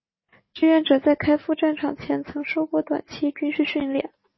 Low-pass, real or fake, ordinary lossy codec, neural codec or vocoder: 7.2 kHz; real; MP3, 24 kbps; none